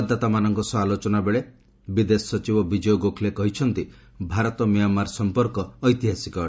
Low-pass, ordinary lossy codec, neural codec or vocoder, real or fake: none; none; none; real